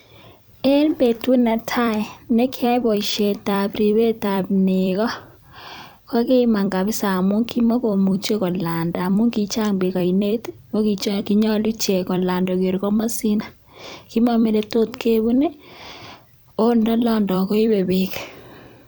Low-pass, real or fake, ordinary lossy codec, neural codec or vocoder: none; fake; none; vocoder, 44.1 kHz, 128 mel bands every 256 samples, BigVGAN v2